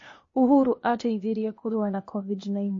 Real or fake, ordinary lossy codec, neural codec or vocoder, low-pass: fake; MP3, 32 kbps; codec, 16 kHz, 0.8 kbps, ZipCodec; 7.2 kHz